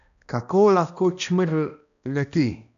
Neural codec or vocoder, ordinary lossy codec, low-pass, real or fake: codec, 16 kHz, 1 kbps, X-Codec, HuBERT features, trained on balanced general audio; AAC, 96 kbps; 7.2 kHz; fake